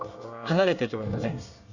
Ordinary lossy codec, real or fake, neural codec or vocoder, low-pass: none; fake; codec, 24 kHz, 1 kbps, SNAC; 7.2 kHz